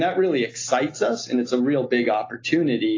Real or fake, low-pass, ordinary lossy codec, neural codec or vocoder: real; 7.2 kHz; AAC, 32 kbps; none